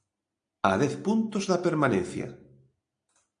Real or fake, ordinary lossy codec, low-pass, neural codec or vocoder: real; AAC, 64 kbps; 9.9 kHz; none